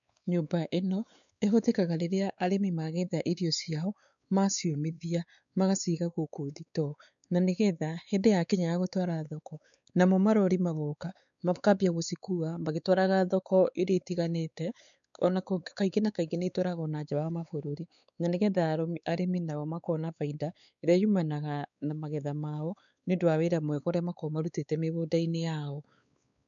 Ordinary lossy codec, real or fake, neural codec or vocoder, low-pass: none; fake; codec, 16 kHz, 4 kbps, X-Codec, WavLM features, trained on Multilingual LibriSpeech; 7.2 kHz